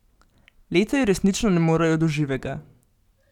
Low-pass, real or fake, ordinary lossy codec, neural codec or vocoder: 19.8 kHz; real; none; none